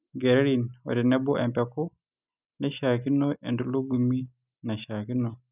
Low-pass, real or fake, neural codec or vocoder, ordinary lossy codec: 3.6 kHz; real; none; none